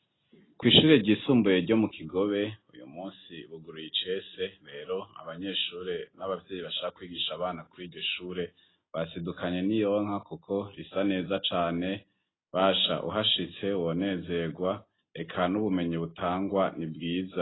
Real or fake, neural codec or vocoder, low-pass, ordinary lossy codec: real; none; 7.2 kHz; AAC, 16 kbps